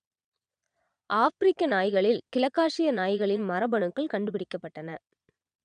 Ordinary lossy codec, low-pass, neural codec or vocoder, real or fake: none; 9.9 kHz; vocoder, 22.05 kHz, 80 mel bands, Vocos; fake